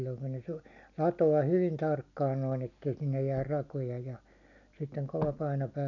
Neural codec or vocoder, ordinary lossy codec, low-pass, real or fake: none; none; 7.2 kHz; real